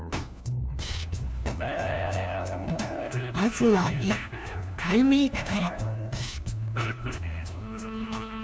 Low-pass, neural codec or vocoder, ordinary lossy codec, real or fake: none; codec, 16 kHz, 1 kbps, FunCodec, trained on LibriTTS, 50 frames a second; none; fake